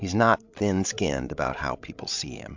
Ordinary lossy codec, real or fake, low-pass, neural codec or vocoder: MP3, 64 kbps; fake; 7.2 kHz; codec, 16 kHz, 16 kbps, FreqCodec, larger model